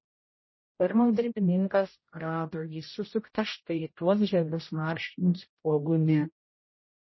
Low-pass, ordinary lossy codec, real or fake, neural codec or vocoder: 7.2 kHz; MP3, 24 kbps; fake; codec, 16 kHz, 0.5 kbps, X-Codec, HuBERT features, trained on general audio